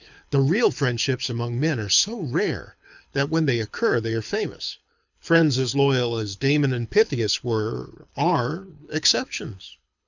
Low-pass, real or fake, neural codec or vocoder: 7.2 kHz; fake; codec, 24 kHz, 6 kbps, HILCodec